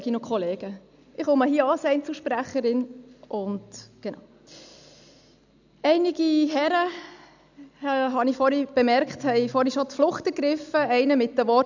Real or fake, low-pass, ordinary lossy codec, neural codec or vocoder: real; 7.2 kHz; none; none